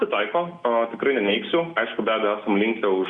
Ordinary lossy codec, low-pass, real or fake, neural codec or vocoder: Opus, 64 kbps; 10.8 kHz; real; none